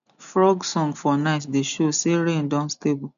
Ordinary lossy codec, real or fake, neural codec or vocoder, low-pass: none; real; none; 7.2 kHz